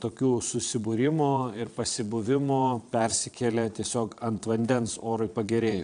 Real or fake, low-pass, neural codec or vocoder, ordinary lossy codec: fake; 9.9 kHz; vocoder, 22.05 kHz, 80 mel bands, Vocos; AAC, 96 kbps